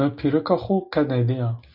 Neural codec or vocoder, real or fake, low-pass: none; real; 5.4 kHz